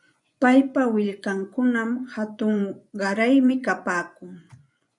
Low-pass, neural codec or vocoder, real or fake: 10.8 kHz; vocoder, 24 kHz, 100 mel bands, Vocos; fake